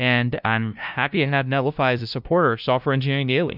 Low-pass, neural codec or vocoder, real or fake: 5.4 kHz; codec, 16 kHz, 0.5 kbps, FunCodec, trained on LibriTTS, 25 frames a second; fake